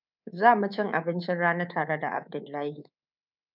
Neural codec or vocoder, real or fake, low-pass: codec, 24 kHz, 3.1 kbps, DualCodec; fake; 5.4 kHz